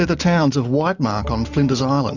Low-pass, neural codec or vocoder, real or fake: 7.2 kHz; none; real